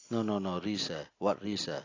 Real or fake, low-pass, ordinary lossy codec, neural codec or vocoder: real; 7.2 kHz; AAC, 32 kbps; none